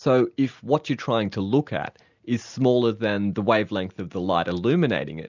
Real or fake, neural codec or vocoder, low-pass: real; none; 7.2 kHz